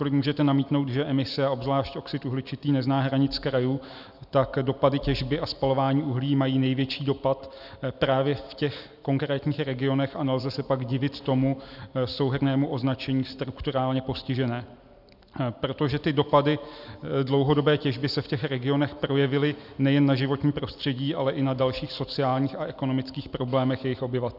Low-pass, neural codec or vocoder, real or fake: 5.4 kHz; none; real